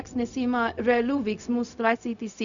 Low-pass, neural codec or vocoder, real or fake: 7.2 kHz; codec, 16 kHz, 0.4 kbps, LongCat-Audio-Codec; fake